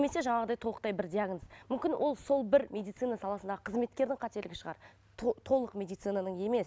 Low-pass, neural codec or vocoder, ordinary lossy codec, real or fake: none; none; none; real